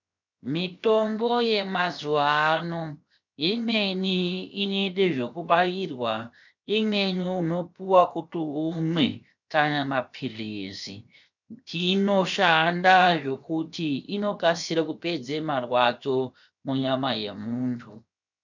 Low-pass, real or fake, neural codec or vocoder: 7.2 kHz; fake; codec, 16 kHz, 0.7 kbps, FocalCodec